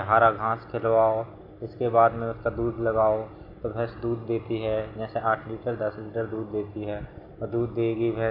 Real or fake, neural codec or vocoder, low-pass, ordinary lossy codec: real; none; 5.4 kHz; AAC, 48 kbps